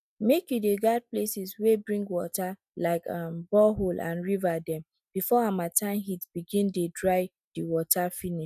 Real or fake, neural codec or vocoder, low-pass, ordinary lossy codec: real; none; 14.4 kHz; none